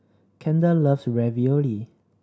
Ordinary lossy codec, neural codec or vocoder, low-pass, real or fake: none; none; none; real